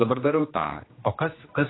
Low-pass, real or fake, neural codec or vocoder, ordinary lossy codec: 7.2 kHz; fake; codec, 16 kHz, 2 kbps, X-Codec, HuBERT features, trained on general audio; AAC, 16 kbps